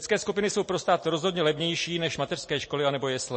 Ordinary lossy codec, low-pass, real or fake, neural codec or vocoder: MP3, 32 kbps; 9.9 kHz; real; none